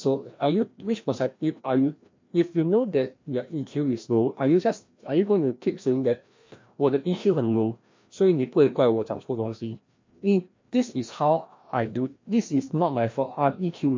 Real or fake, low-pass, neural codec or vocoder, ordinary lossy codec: fake; 7.2 kHz; codec, 16 kHz, 1 kbps, FreqCodec, larger model; MP3, 48 kbps